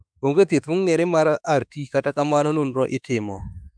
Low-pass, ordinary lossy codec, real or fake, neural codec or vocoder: 9.9 kHz; none; fake; autoencoder, 48 kHz, 32 numbers a frame, DAC-VAE, trained on Japanese speech